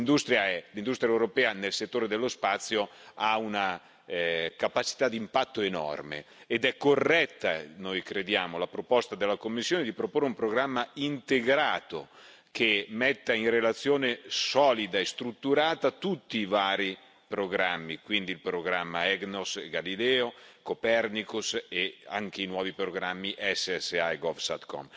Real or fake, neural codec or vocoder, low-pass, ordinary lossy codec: real; none; none; none